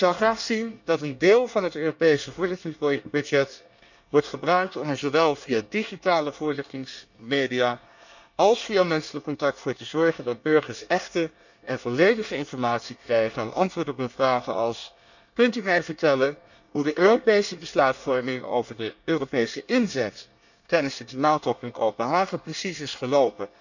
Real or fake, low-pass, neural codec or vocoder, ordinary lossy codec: fake; 7.2 kHz; codec, 24 kHz, 1 kbps, SNAC; none